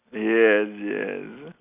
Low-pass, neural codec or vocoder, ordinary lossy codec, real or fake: 3.6 kHz; none; none; real